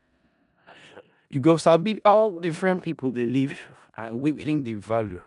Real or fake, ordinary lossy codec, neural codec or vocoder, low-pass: fake; none; codec, 16 kHz in and 24 kHz out, 0.4 kbps, LongCat-Audio-Codec, four codebook decoder; 10.8 kHz